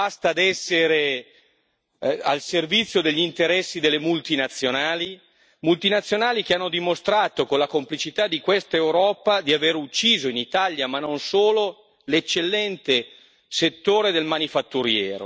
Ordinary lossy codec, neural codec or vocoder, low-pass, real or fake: none; none; none; real